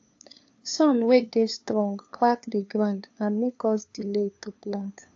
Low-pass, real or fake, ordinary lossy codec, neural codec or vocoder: 7.2 kHz; fake; AAC, 48 kbps; codec, 16 kHz, 2 kbps, FunCodec, trained on LibriTTS, 25 frames a second